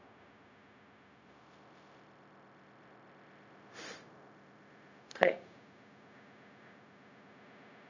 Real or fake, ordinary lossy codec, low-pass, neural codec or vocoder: fake; none; 7.2 kHz; codec, 16 kHz, 0.4 kbps, LongCat-Audio-Codec